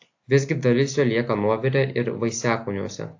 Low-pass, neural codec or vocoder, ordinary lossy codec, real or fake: 7.2 kHz; none; AAC, 48 kbps; real